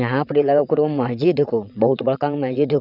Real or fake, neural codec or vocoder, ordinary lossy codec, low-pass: fake; vocoder, 22.05 kHz, 80 mel bands, WaveNeXt; none; 5.4 kHz